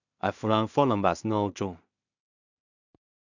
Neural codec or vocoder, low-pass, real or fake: codec, 16 kHz in and 24 kHz out, 0.4 kbps, LongCat-Audio-Codec, two codebook decoder; 7.2 kHz; fake